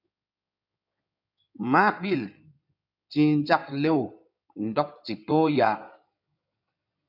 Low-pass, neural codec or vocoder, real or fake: 5.4 kHz; codec, 16 kHz in and 24 kHz out, 2.2 kbps, FireRedTTS-2 codec; fake